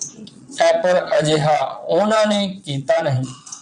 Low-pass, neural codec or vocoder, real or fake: 9.9 kHz; vocoder, 22.05 kHz, 80 mel bands, Vocos; fake